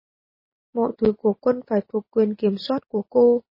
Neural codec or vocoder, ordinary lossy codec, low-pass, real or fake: none; MP3, 24 kbps; 5.4 kHz; real